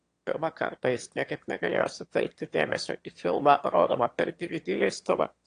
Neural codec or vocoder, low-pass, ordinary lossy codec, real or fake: autoencoder, 22.05 kHz, a latent of 192 numbers a frame, VITS, trained on one speaker; 9.9 kHz; AAC, 48 kbps; fake